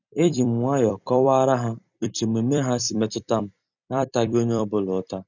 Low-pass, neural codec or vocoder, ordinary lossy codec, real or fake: 7.2 kHz; vocoder, 44.1 kHz, 128 mel bands every 256 samples, BigVGAN v2; none; fake